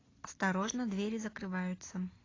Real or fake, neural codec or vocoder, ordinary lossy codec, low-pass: real; none; AAC, 32 kbps; 7.2 kHz